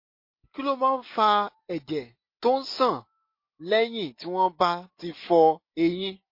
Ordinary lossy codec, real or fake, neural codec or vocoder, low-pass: MP3, 32 kbps; real; none; 5.4 kHz